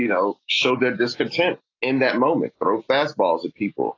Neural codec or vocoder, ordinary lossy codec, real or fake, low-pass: none; AAC, 32 kbps; real; 7.2 kHz